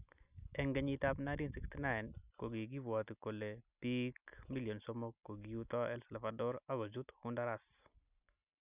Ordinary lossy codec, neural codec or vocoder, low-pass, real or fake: none; none; 3.6 kHz; real